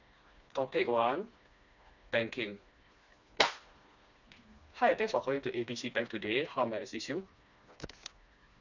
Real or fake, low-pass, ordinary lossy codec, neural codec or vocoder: fake; 7.2 kHz; none; codec, 16 kHz, 2 kbps, FreqCodec, smaller model